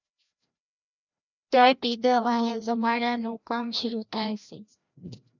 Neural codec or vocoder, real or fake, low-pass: codec, 16 kHz, 1 kbps, FreqCodec, larger model; fake; 7.2 kHz